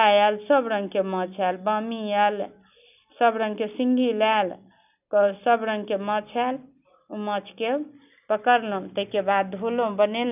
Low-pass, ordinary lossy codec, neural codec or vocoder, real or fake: 3.6 kHz; none; none; real